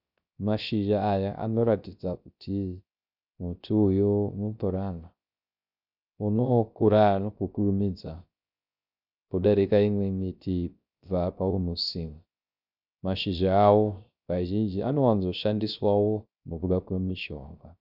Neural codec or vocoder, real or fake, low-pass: codec, 16 kHz, 0.3 kbps, FocalCodec; fake; 5.4 kHz